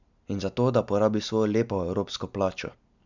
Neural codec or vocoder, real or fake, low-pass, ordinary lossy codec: none; real; 7.2 kHz; none